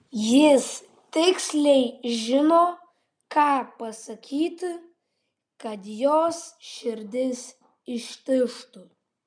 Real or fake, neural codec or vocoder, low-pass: real; none; 9.9 kHz